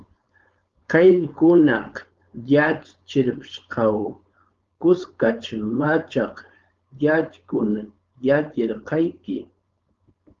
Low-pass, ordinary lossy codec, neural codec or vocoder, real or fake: 7.2 kHz; Opus, 32 kbps; codec, 16 kHz, 4.8 kbps, FACodec; fake